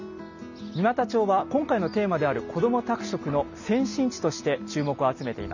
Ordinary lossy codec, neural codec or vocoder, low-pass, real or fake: none; none; 7.2 kHz; real